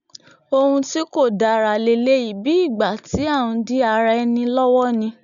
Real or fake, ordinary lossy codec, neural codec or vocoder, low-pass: real; none; none; 7.2 kHz